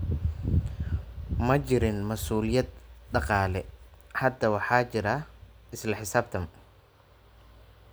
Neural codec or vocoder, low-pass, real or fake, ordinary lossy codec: none; none; real; none